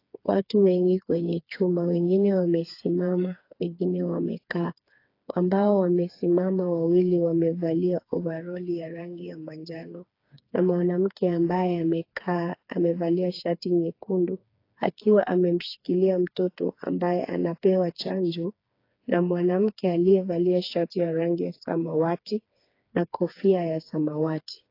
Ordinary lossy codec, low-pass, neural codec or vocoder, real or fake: AAC, 32 kbps; 5.4 kHz; codec, 16 kHz, 4 kbps, FreqCodec, smaller model; fake